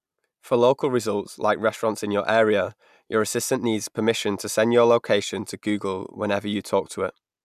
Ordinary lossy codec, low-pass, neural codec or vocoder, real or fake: none; 14.4 kHz; none; real